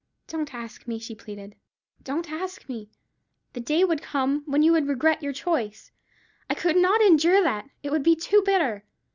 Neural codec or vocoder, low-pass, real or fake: none; 7.2 kHz; real